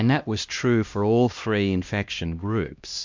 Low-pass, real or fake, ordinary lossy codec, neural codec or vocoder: 7.2 kHz; fake; MP3, 64 kbps; codec, 16 kHz, 1 kbps, X-Codec, HuBERT features, trained on LibriSpeech